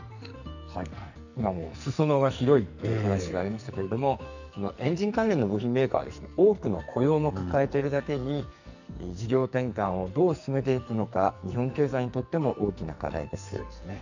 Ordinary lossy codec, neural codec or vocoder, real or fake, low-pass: none; codec, 44.1 kHz, 2.6 kbps, SNAC; fake; 7.2 kHz